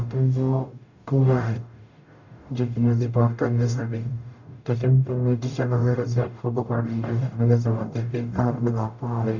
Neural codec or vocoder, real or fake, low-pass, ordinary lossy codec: codec, 44.1 kHz, 0.9 kbps, DAC; fake; 7.2 kHz; none